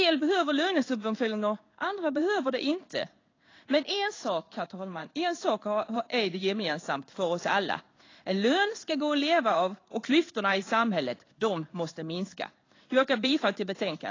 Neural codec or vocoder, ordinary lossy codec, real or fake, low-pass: codec, 16 kHz in and 24 kHz out, 1 kbps, XY-Tokenizer; AAC, 32 kbps; fake; 7.2 kHz